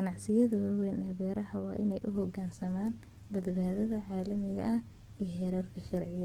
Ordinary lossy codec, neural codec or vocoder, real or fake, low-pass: Opus, 16 kbps; codec, 44.1 kHz, 7.8 kbps, DAC; fake; 19.8 kHz